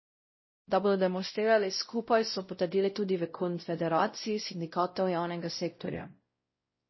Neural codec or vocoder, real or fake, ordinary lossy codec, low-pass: codec, 16 kHz, 0.5 kbps, X-Codec, WavLM features, trained on Multilingual LibriSpeech; fake; MP3, 24 kbps; 7.2 kHz